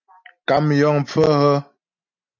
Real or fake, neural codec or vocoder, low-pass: real; none; 7.2 kHz